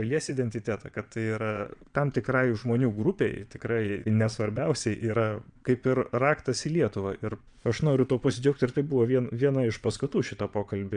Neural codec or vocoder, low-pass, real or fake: vocoder, 22.05 kHz, 80 mel bands, Vocos; 9.9 kHz; fake